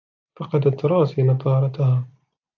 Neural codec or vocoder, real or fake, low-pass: none; real; 7.2 kHz